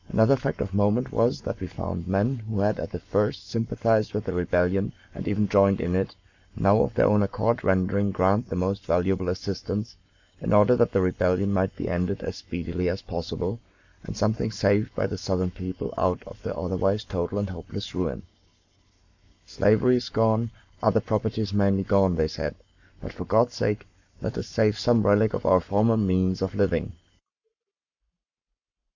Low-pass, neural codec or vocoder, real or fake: 7.2 kHz; codec, 44.1 kHz, 7.8 kbps, Pupu-Codec; fake